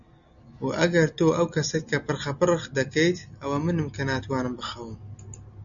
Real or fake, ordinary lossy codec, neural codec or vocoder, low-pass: real; MP3, 96 kbps; none; 7.2 kHz